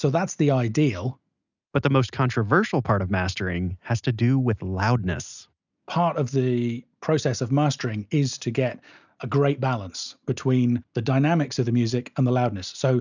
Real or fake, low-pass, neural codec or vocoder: real; 7.2 kHz; none